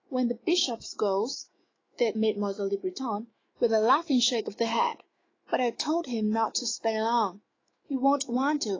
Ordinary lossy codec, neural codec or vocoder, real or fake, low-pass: AAC, 32 kbps; autoencoder, 48 kHz, 128 numbers a frame, DAC-VAE, trained on Japanese speech; fake; 7.2 kHz